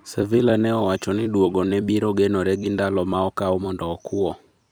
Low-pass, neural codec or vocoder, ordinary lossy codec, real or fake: none; vocoder, 44.1 kHz, 128 mel bands every 256 samples, BigVGAN v2; none; fake